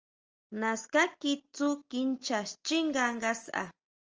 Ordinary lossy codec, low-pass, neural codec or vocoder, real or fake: Opus, 24 kbps; 7.2 kHz; none; real